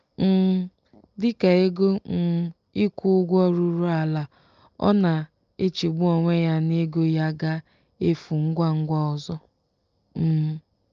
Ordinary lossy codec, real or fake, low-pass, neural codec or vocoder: Opus, 24 kbps; real; 7.2 kHz; none